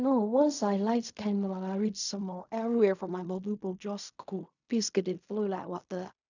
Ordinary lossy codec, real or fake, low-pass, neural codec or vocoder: none; fake; 7.2 kHz; codec, 16 kHz in and 24 kHz out, 0.4 kbps, LongCat-Audio-Codec, fine tuned four codebook decoder